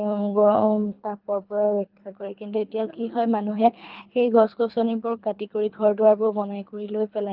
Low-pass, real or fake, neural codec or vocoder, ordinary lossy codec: 5.4 kHz; fake; codec, 24 kHz, 3 kbps, HILCodec; Opus, 32 kbps